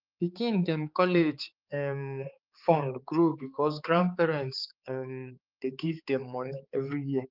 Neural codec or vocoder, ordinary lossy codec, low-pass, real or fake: codec, 16 kHz, 4 kbps, X-Codec, HuBERT features, trained on balanced general audio; Opus, 32 kbps; 5.4 kHz; fake